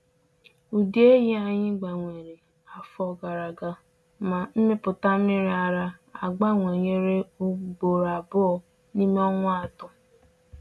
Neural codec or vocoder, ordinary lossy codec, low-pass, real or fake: none; none; none; real